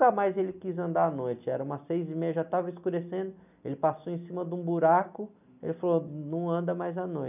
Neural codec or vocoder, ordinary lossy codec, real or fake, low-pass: none; none; real; 3.6 kHz